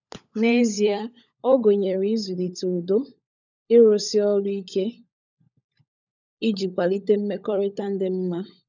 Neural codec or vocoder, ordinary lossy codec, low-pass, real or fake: codec, 16 kHz, 16 kbps, FunCodec, trained on LibriTTS, 50 frames a second; none; 7.2 kHz; fake